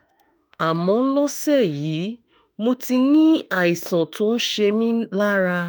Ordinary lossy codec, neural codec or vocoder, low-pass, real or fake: none; autoencoder, 48 kHz, 32 numbers a frame, DAC-VAE, trained on Japanese speech; none; fake